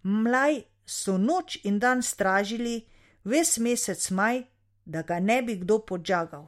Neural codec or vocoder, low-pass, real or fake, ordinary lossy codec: none; 19.8 kHz; real; MP3, 64 kbps